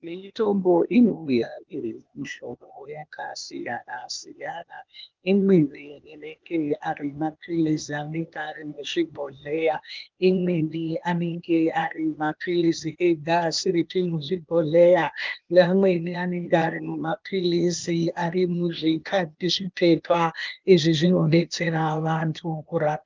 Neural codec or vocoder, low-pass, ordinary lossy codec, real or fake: codec, 16 kHz, 0.8 kbps, ZipCodec; 7.2 kHz; Opus, 24 kbps; fake